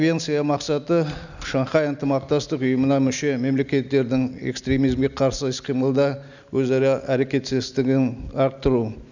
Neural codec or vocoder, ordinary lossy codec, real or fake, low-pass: none; none; real; 7.2 kHz